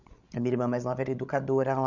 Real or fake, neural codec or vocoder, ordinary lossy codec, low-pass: fake; codec, 16 kHz, 16 kbps, FreqCodec, larger model; none; 7.2 kHz